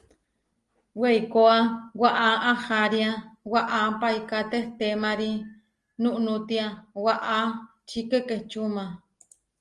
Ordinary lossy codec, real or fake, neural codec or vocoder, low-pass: Opus, 24 kbps; real; none; 10.8 kHz